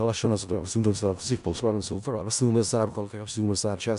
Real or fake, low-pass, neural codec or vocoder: fake; 10.8 kHz; codec, 16 kHz in and 24 kHz out, 0.4 kbps, LongCat-Audio-Codec, four codebook decoder